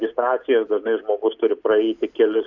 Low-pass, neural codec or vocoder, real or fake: 7.2 kHz; none; real